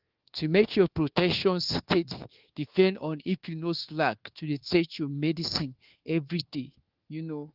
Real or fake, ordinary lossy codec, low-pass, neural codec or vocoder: fake; Opus, 16 kbps; 5.4 kHz; codec, 24 kHz, 1.2 kbps, DualCodec